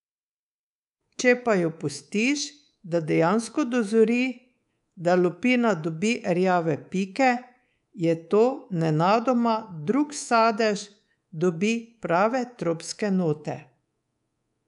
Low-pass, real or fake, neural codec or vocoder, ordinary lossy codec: 10.8 kHz; fake; codec, 24 kHz, 3.1 kbps, DualCodec; none